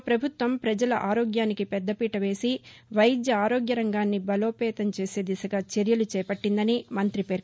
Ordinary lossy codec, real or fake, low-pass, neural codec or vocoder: none; real; none; none